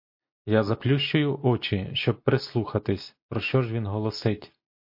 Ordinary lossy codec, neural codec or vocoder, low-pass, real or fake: MP3, 32 kbps; none; 5.4 kHz; real